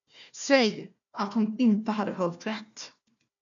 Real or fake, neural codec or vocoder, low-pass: fake; codec, 16 kHz, 1 kbps, FunCodec, trained on Chinese and English, 50 frames a second; 7.2 kHz